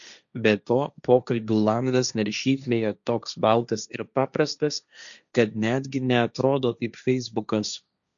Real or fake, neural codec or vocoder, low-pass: fake; codec, 16 kHz, 1.1 kbps, Voila-Tokenizer; 7.2 kHz